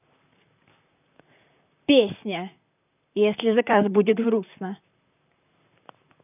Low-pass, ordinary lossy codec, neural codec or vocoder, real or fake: 3.6 kHz; none; vocoder, 44.1 kHz, 128 mel bands, Pupu-Vocoder; fake